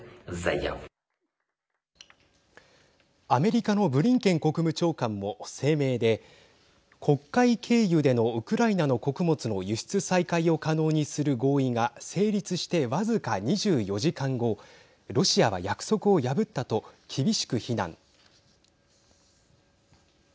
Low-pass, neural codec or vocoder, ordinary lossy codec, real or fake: none; none; none; real